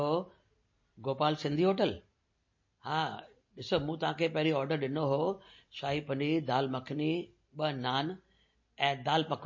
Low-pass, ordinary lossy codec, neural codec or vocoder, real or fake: 7.2 kHz; MP3, 32 kbps; none; real